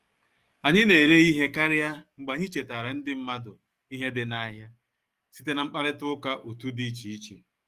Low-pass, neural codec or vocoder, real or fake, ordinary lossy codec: 14.4 kHz; codec, 44.1 kHz, 7.8 kbps, DAC; fake; Opus, 32 kbps